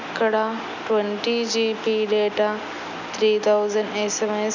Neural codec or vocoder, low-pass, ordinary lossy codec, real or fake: none; 7.2 kHz; none; real